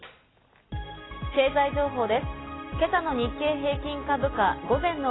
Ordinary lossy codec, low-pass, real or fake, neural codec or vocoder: AAC, 16 kbps; 7.2 kHz; real; none